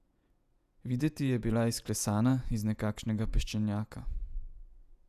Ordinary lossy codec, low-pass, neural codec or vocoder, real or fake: none; 14.4 kHz; vocoder, 48 kHz, 128 mel bands, Vocos; fake